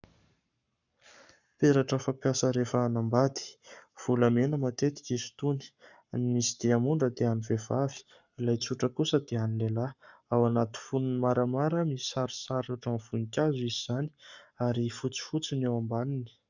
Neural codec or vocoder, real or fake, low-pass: codec, 44.1 kHz, 7.8 kbps, Pupu-Codec; fake; 7.2 kHz